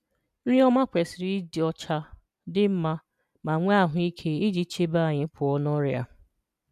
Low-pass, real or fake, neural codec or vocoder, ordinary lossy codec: 14.4 kHz; real; none; none